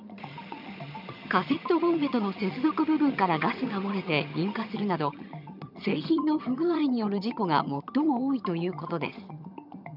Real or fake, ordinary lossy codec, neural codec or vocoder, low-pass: fake; none; vocoder, 22.05 kHz, 80 mel bands, HiFi-GAN; 5.4 kHz